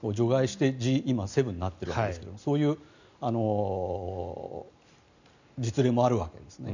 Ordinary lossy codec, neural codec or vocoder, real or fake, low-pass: none; none; real; 7.2 kHz